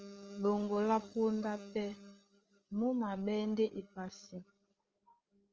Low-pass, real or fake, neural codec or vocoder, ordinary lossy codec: 7.2 kHz; fake; codec, 16 kHz, 8 kbps, FreqCodec, larger model; Opus, 24 kbps